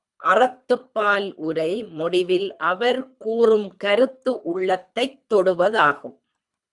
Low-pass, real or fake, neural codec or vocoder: 10.8 kHz; fake; codec, 24 kHz, 3 kbps, HILCodec